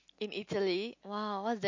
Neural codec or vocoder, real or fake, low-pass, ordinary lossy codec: none; real; 7.2 kHz; MP3, 48 kbps